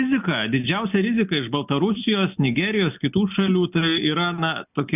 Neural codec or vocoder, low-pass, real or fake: none; 3.6 kHz; real